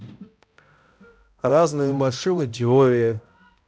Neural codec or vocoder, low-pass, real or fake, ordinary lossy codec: codec, 16 kHz, 0.5 kbps, X-Codec, HuBERT features, trained on balanced general audio; none; fake; none